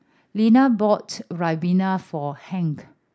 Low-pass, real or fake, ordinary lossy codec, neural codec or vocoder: none; real; none; none